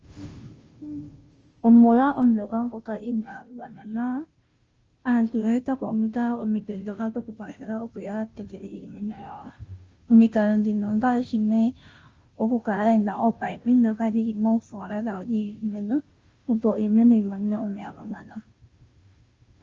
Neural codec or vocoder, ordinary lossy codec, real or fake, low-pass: codec, 16 kHz, 0.5 kbps, FunCodec, trained on Chinese and English, 25 frames a second; Opus, 24 kbps; fake; 7.2 kHz